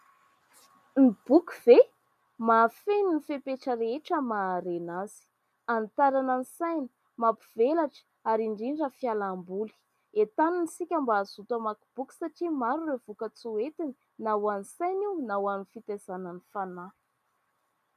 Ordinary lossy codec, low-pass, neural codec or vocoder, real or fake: MP3, 96 kbps; 14.4 kHz; none; real